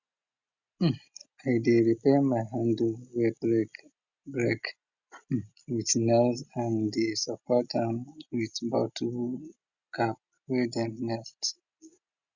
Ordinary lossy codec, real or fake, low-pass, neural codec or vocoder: Opus, 64 kbps; real; 7.2 kHz; none